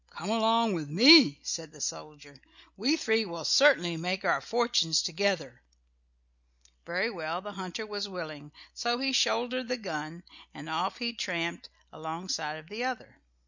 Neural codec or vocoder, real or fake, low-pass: none; real; 7.2 kHz